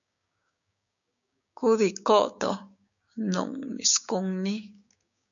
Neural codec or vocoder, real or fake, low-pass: codec, 16 kHz, 6 kbps, DAC; fake; 7.2 kHz